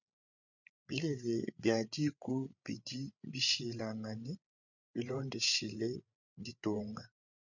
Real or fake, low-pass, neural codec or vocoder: fake; 7.2 kHz; codec, 16 kHz, 8 kbps, FreqCodec, larger model